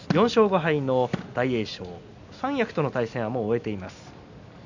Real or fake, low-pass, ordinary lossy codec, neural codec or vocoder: real; 7.2 kHz; none; none